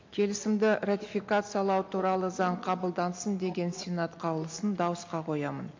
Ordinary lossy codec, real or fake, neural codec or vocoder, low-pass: MP3, 48 kbps; real; none; 7.2 kHz